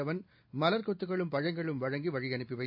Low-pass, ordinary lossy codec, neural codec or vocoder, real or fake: 5.4 kHz; AAC, 48 kbps; none; real